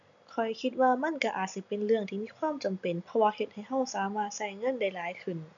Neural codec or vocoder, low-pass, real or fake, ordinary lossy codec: none; 7.2 kHz; real; none